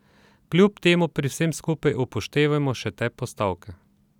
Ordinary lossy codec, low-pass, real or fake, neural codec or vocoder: none; 19.8 kHz; real; none